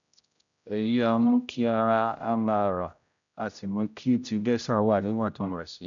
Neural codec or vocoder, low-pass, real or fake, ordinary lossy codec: codec, 16 kHz, 0.5 kbps, X-Codec, HuBERT features, trained on general audio; 7.2 kHz; fake; none